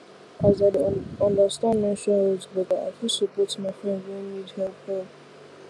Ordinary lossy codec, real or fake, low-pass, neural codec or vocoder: none; real; none; none